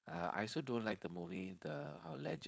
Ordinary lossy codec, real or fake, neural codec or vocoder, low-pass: none; fake; codec, 16 kHz, 4.8 kbps, FACodec; none